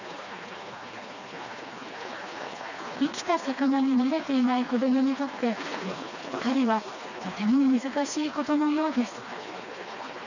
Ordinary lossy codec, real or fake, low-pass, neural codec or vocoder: none; fake; 7.2 kHz; codec, 16 kHz, 2 kbps, FreqCodec, smaller model